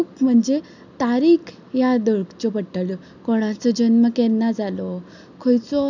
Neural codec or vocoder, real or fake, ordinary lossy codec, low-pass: none; real; none; 7.2 kHz